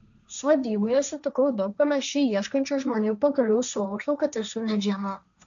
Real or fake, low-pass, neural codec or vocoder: fake; 7.2 kHz; codec, 16 kHz, 1.1 kbps, Voila-Tokenizer